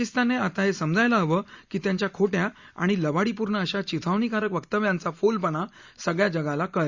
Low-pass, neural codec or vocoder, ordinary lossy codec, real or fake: 7.2 kHz; none; Opus, 64 kbps; real